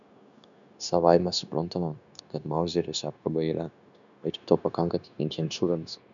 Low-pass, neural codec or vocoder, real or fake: 7.2 kHz; codec, 16 kHz, 0.9 kbps, LongCat-Audio-Codec; fake